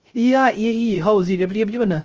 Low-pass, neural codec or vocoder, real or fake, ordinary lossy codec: 7.2 kHz; codec, 16 kHz, 0.3 kbps, FocalCodec; fake; Opus, 24 kbps